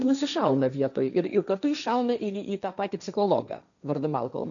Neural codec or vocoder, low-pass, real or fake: codec, 16 kHz, 1.1 kbps, Voila-Tokenizer; 7.2 kHz; fake